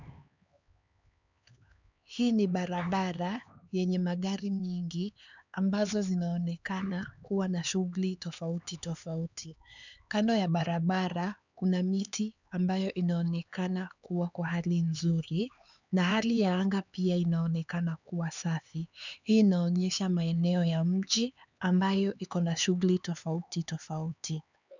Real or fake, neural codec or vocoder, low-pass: fake; codec, 16 kHz, 4 kbps, X-Codec, HuBERT features, trained on LibriSpeech; 7.2 kHz